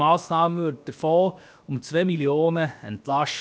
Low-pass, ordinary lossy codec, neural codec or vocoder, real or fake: none; none; codec, 16 kHz, about 1 kbps, DyCAST, with the encoder's durations; fake